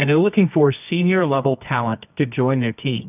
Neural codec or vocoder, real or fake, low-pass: codec, 24 kHz, 0.9 kbps, WavTokenizer, medium music audio release; fake; 3.6 kHz